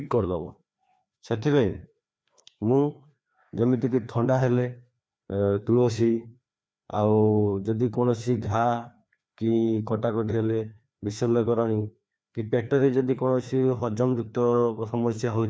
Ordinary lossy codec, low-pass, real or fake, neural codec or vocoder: none; none; fake; codec, 16 kHz, 2 kbps, FreqCodec, larger model